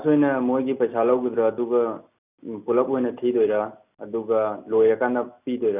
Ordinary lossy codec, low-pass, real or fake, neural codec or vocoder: none; 3.6 kHz; real; none